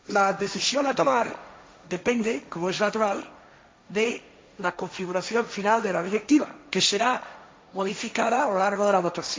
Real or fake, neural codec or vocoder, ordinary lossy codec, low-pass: fake; codec, 16 kHz, 1.1 kbps, Voila-Tokenizer; none; none